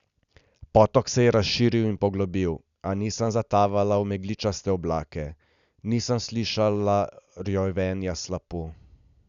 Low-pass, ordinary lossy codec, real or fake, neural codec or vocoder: 7.2 kHz; none; real; none